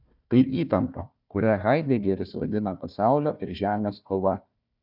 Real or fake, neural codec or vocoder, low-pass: fake; codec, 16 kHz, 1 kbps, FunCodec, trained on Chinese and English, 50 frames a second; 5.4 kHz